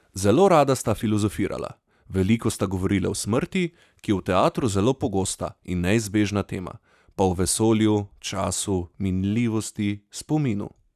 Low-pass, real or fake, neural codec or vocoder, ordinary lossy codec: 14.4 kHz; real; none; none